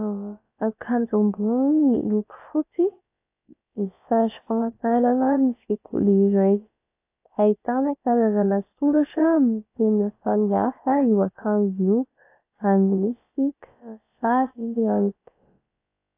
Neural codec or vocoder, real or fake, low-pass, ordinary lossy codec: codec, 16 kHz, about 1 kbps, DyCAST, with the encoder's durations; fake; 3.6 kHz; AAC, 24 kbps